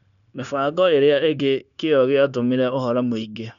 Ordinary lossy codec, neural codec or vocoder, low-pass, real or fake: none; codec, 16 kHz, 0.9 kbps, LongCat-Audio-Codec; 7.2 kHz; fake